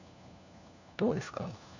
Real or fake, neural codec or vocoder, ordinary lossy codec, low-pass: fake; codec, 16 kHz, 1 kbps, FunCodec, trained on LibriTTS, 50 frames a second; none; 7.2 kHz